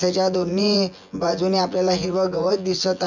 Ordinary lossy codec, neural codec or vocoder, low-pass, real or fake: none; vocoder, 24 kHz, 100 mel bands, Vocos; 7.2 kHz; fake